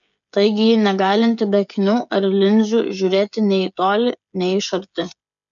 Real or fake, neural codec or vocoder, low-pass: fake; codec, 16 kHz, 16 kbps, FreqCodec, smaller model; 7.2 kHz